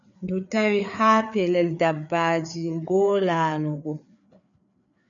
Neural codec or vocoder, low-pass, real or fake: codec, 16 kHz, 4 kbps, FreqCodec, larger model; 7.2 kHz; fake